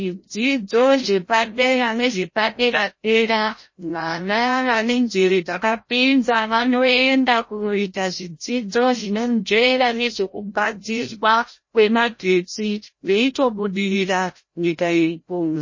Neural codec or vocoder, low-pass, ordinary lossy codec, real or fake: codec, 16 kHz, 0.5 kbps, FreqCodec, larger model; 7.2 kHz; MP3, 32 kbps; fake